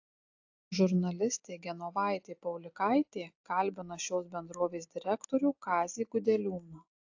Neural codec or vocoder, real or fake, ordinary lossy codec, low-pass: none; real; AAC, 48 kbps; 7.2 kHz